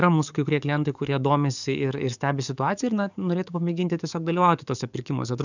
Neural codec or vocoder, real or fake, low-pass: codec, 44.1 kHz, 7.8 kbps, DAC; fake; 7.2 kHz